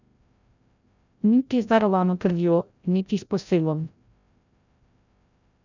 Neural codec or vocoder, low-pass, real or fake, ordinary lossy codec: codec, 16 kHz, 0.5 kbps, FreqCodec, larger model; 7.2 kHz; fake; none